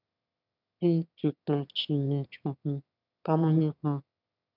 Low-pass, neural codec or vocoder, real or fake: 5.4 kHz; autoencoder, 22.05 kHz, a latent of 192 numbers a frame, VITS, trained on one speaker; fake